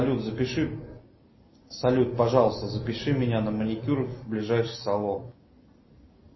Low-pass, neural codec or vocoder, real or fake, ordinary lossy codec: 7.2 kHz; none; real; MP3, 24 kbps